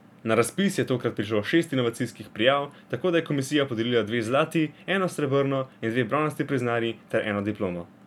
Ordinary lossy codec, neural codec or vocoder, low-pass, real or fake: none; none; 19.8 kHz; real